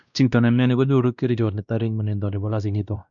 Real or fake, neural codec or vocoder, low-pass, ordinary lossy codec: fake; codec, 16 kHz, 1 kbps, X-Codec, WavLM features, trained on Multilingual LibriSpeech; 7.2 kHz; none